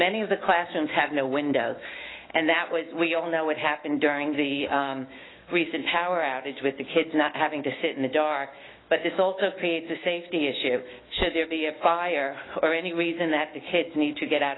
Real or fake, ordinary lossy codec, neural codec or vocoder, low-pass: fake; AAC, 16 kbps; autoencoder, 48 kHz, 128 numbers a frame, DAC-VAE, trained on Japanese speech; 7.2 kHz